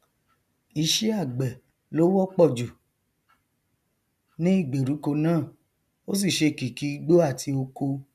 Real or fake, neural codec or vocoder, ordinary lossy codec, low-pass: real; none; none; 14.4 kHz